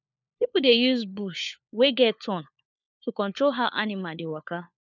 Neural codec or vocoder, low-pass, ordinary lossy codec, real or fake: codec, 16 kHz, 4 kbps, FunCodec, trained on LibriTTS, 50 frames a second; 7.2 kHz; none; fake